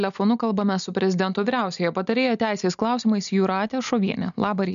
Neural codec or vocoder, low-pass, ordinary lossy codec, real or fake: none; 7.2 kHz; MP3, 96 kbps; real